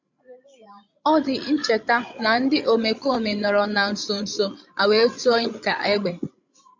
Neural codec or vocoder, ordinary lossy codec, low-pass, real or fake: codec, 16 kHz, 16 kbps, FreqCodec, larger model; MP3, 64 kbps; 7.2 kHz; fake